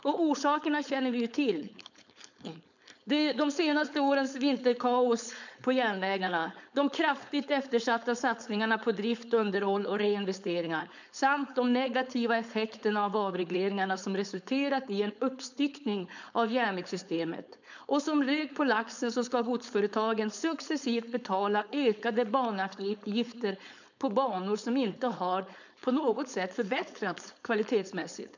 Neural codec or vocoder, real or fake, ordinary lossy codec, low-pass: codec, 16 kHz, 4.8 kbps, FACodec; fake; none; 7.2 kHz